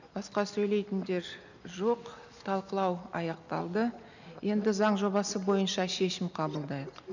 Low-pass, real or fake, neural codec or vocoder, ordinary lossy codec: 7.2 kHz; real; none; none